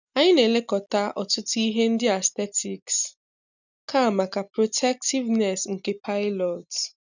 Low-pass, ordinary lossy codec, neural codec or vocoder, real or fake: 7.2 kHz; none; none; real